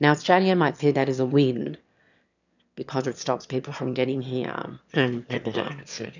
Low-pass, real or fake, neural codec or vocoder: 7.2 kHz; fake; autoencoder, 22.05 kHz, a latent of 192 numbers a frame, VITS, trained on one speaker